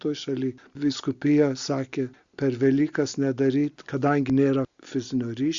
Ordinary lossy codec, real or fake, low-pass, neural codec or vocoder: Opus, 64 kbps; real; 7.2 kHz; none